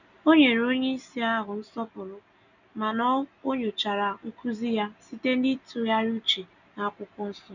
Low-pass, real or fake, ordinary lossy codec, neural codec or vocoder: 7.2 kHz; real; none; none